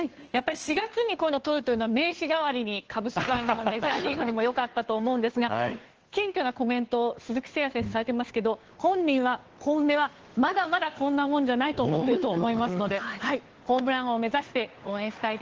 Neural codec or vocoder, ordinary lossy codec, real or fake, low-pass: codec, 16 kHz, 2 kbps, FunCodec, trained on Chinese and English, 25 frames a second; Opus, 16 kbps; fake; 7.2 kHz